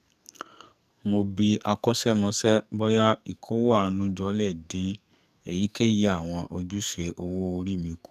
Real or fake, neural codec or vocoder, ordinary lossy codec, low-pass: fake; codec, 44.1 kHz, 2.6 kbps, SNAC; none; 14.4 kHz